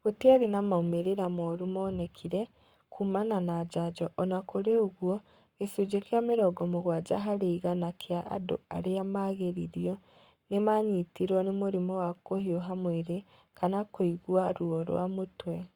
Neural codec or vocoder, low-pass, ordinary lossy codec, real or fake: codec, 44.1 kHz, 7.8 kbps, Pupu-Codec; 19.8 kHz; Opus, 64 kbps; fake